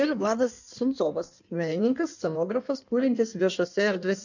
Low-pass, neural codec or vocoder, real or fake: 7.2 kHz; codec, 16 kHz in and 24 kHz out, 1.1 kbps, FireRedTTS-2 codec; fake